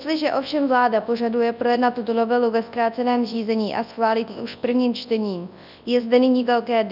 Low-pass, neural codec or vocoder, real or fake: 5.4 kHz; codec, 24 kHz, 0.9 kbps, WavTokenizer, large speech release; fake